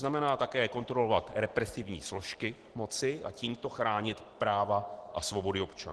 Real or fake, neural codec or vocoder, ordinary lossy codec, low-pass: real; none; Opus, 16 kbps; 10.8 kHz